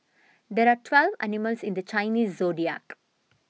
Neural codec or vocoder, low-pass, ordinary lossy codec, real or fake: none; none; none; real